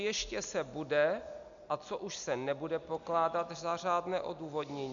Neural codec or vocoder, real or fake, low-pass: none; real; 7.2 kHz